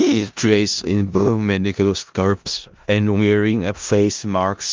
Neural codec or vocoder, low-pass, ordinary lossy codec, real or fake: codec, 16 kHz in and 24 kHz out, 0.4 kbps, LongCat-Audio-Codec, four codebook decoder; 7.2 kHz; Opus, 32 kbps; fake